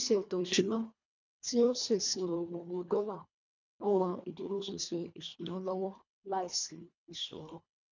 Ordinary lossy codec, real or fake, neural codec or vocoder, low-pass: MP3, 64 kbps; fake; codec, 24 kHz, 1.5 kbps, HILCodec; 7.2 kHz